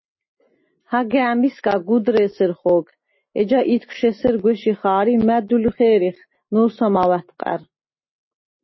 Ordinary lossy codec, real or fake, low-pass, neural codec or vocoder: MP3, 24 kbps; real; 7.2 kHz; none